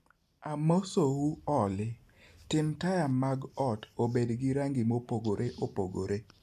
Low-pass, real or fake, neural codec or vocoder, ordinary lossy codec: 14.4 kHz; real; none; AAC, 96 kbps